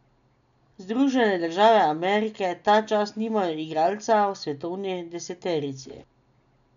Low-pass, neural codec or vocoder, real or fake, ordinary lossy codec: 7.2 kHz; none; real; none